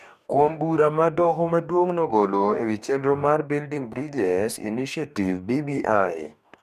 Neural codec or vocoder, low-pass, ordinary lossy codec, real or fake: codec, 44.1 kHz, 2.6 kbps, DAC; 14.4 kHz; none; fake